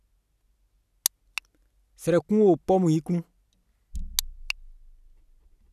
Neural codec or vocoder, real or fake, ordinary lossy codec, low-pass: none; real; none; 14.4 kHz